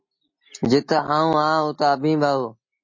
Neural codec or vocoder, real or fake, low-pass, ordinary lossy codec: none; real; 7.2 kHz; MP3, 32 kbps